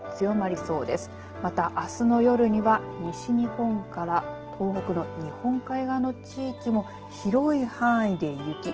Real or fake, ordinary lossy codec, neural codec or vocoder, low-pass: real; Opus, 16 kbps; none; 7.2 kHz